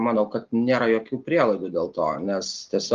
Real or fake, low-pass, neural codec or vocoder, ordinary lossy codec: real; 7.2 kHz; none; Opus, 32 kbps